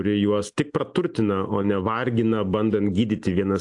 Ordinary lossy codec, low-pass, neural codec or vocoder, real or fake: AAC, 64 kbps; 10.8 kHz; none; real